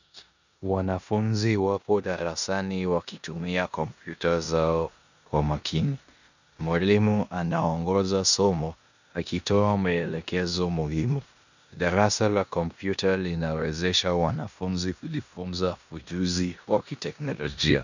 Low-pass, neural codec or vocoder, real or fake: 7.2 kHz; codec, 16 kHz in and 24 kHz out, 0.9 kbps, LongCat-Audio-Codec, four codebook decoder; fake